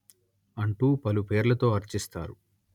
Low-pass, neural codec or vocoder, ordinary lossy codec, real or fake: 19.8 kHz; none; none; real